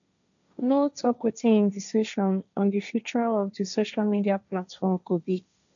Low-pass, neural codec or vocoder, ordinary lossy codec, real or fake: 7.2 kHz; codec, 16 kHz, 1.1 kbps, Voila-Tokenizer; none; fake